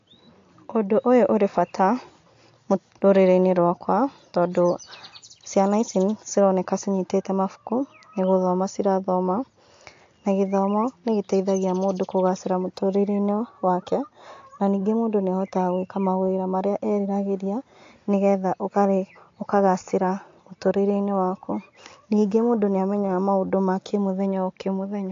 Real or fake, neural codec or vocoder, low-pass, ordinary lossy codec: real; none; 7.2 kHz; AAC, 48 kbps